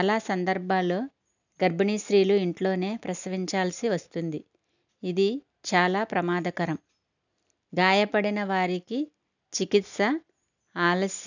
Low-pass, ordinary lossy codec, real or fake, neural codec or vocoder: 7.2 kHz; none; real; none